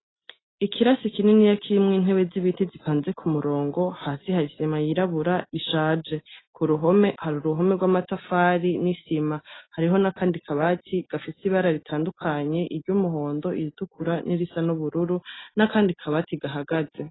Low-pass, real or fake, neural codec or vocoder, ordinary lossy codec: 7.2 kHz; real; none; AAC, 16 kbps